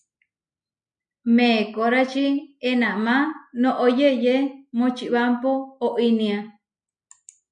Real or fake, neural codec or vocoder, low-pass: real; none; 9.9 kHz